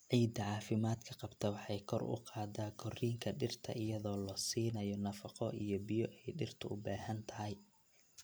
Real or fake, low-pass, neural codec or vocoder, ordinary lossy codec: real; none; none; none